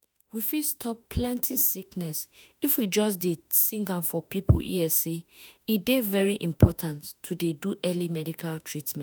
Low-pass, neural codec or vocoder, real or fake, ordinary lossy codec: none; autoencoder, 48 kHz, 32 numbers a frame, DAC-VAE, trained on Japanese speech; fake; none